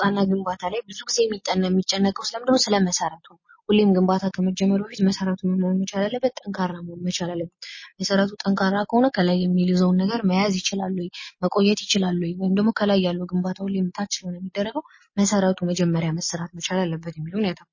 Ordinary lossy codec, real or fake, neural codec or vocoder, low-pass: MP3, 32 kbps; real; none; 7.2 kHz